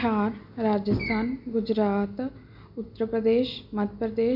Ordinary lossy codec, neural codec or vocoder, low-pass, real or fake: none; none; 5.4 kHz; real